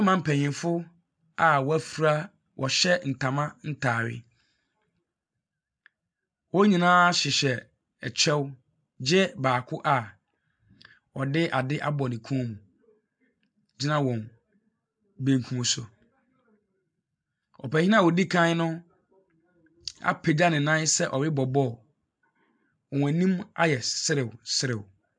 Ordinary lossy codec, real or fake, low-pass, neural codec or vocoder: MP3, 96 kbps; real; 9.9 kHz; none